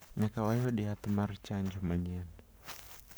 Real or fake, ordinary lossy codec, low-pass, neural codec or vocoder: fake; none; none; codec, 44.1 kHz, 7.8 kbps, Pupu-Codec